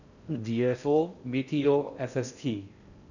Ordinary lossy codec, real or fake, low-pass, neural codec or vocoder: none; fake; 7.2 kHz; codec, 16 kHz in and 24 kHz out, 0.6 kbps, FocalCodec, streaming, 4096 codes